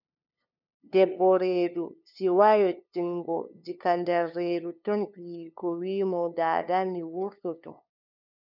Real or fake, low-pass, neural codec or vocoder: fake; 5.4 kHz; codec, 16 kHz, 2 kbps, FunCodec, trained on LibriTTS, 25 frames a second